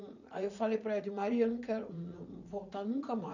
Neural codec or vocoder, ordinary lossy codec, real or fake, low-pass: vocoder, 44.1 kHz, 80 mel bands, Vocos; none; fake; 7.2 kHz